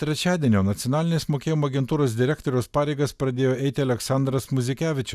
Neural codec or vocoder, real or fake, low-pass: none; real; 14.4 kHz